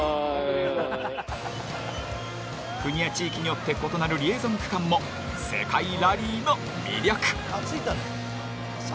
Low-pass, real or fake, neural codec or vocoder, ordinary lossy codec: none; real; none; none